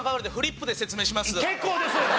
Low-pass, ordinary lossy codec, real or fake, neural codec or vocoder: none; none; real; none